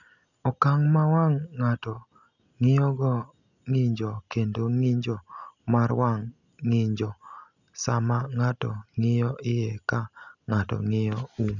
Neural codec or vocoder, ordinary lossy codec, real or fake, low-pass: none; none; real; 7.2 kHz